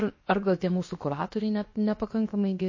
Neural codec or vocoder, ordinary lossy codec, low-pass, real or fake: codec, 16 kHz, 0.7 kbps, FocalCodec; MP3, 32 kbps; 7.2 kHz; fake